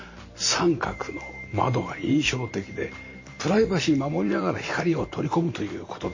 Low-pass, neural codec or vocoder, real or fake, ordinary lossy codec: 7.2 kHz; vocoder, 44.1 kHz, 128 mel bands every 512 samples, BigVGAN v2; fake; MP3, 32 kbps